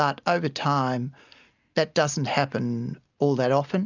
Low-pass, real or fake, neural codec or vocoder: 7.2 kHz; real; none